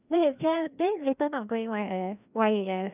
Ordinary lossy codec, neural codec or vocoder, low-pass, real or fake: none; codec, 16 kHz, 1 kbps, FreqCodec, larger model; 3.6 kHz; fake